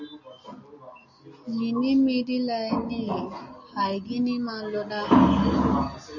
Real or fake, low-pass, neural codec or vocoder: real; 7.2 kHz; none